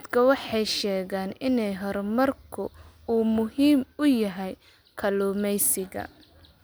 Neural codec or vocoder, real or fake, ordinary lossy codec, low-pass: none; real; none; none